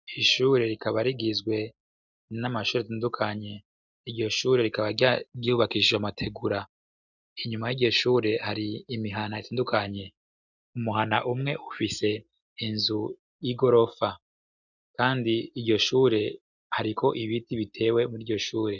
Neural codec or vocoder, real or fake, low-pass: none; real; 7.2 kHz